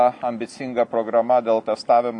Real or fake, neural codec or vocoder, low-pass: real; none; 10.8 kHz